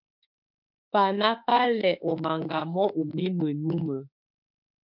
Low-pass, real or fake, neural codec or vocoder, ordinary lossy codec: 5.4 kHz; fake; autoencoder, 48 kHz, 32 numbers a frame, DAC-VAE, trained on Japanese speech; MP3, 48 kbps